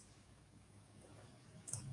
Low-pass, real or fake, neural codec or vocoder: 10.8 kHz; fake; codec, 44.1 kHz, 7.8 kbps, DAC